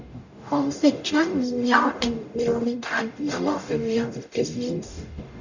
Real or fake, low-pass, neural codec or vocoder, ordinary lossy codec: fake; 7.2 kHz; codec, 44.1 kHz, 0.9 kbps, DAC; none